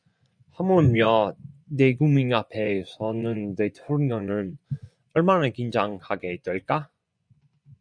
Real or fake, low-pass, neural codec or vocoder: fake; 9.9 kHz; vocoder, 24 kHz, 100 mel bands, Vocos